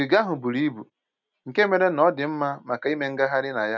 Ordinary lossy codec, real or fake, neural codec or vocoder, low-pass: none; real; none; 7.2 kHz